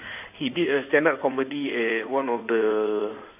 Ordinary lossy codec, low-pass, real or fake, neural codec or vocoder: none; 3.6 kHz; fake; codec, 16 kHz in and 24 kHz out, 2.2 kbps, FireRedTTS-2 codec